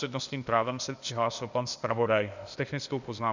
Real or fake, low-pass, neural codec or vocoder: fake; 7.2 kHz; codec, 16 kHz, 0.8 kbps, ZipCodec